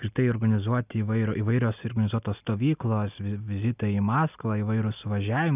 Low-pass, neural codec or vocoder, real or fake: 3.6 kHz; none; real